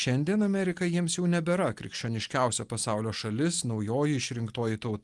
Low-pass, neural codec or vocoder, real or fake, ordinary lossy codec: 10.8 kHz; none; real; Opus, 24 kbps